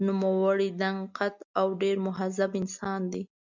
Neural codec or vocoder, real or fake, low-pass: none; real; 7.2 kHz